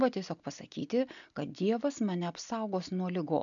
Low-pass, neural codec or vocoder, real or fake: 7.2 kHz; none; real